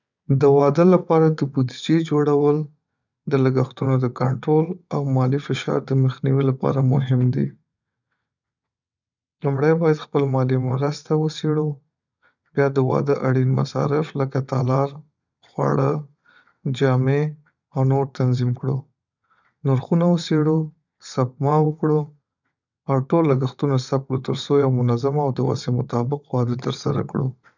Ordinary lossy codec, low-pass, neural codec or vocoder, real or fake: none; 7.2 kHz; vocoder, 22.05 kHz, 80 mel bands, WaveNeXt; fake